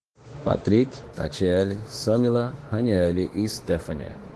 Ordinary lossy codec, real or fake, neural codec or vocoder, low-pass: Opus, 16 kbps; fake; autoencoder, 48 kHz, 32 numbers a frame, DAC-VAE, trained on Japanese speech; 10.8 kHz